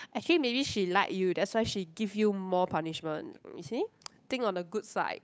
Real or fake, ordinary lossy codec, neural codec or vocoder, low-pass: fake; none; codec, 16 kHz, 8 kbps, FunCodec, trained on Chinese and English, 25 frames a second; none